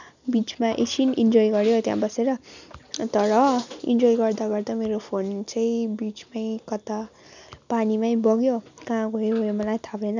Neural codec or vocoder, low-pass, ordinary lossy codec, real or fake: none; 7.2 kHz; none; real